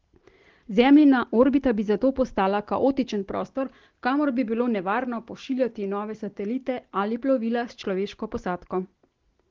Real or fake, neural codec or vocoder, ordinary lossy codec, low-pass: real; none; Opus, 16 kbps; 7.2 kHz